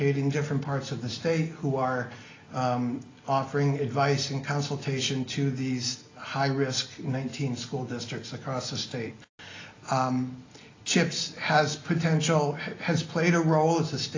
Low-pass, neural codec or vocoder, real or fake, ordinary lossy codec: 7.2 kHz; none; real; AAC, 32 kbps